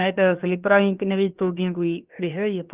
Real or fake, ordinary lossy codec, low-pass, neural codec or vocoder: fake; Opus, 32 kbps; 3.6 kHz; codec, 16 kHz, about 1 kbps, DyCAST, with the encoder's durations